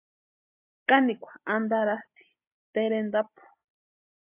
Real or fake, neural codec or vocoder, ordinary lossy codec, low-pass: real; none; AAC, 32 kbps; 3.6 kHz